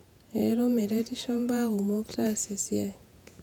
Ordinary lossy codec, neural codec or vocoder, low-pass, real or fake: none; vocoder, 48 kHz, 128 mel bands, Vocos; 19.8 kHz; fake